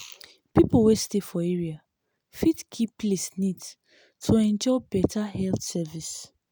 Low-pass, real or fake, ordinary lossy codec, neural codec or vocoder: none; real; none; none